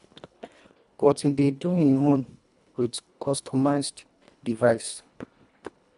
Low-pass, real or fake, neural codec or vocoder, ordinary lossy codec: 10.8 kHz; fake; codec, 24 kHz, 1.5 kbps, HILCodec; none